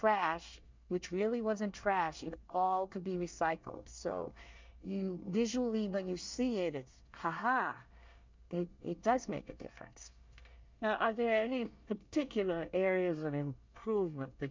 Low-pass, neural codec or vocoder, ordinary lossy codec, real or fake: 7.2 kHz; codec, 24 kHz, 1 kbps, SNAC; MP3, 64 kbps; fake